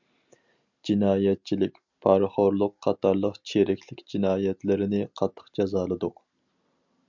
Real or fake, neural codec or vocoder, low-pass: real; none; 7.2 kHz